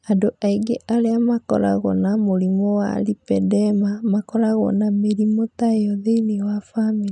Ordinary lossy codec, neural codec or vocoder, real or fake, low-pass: none; none; real; 10.8 kHz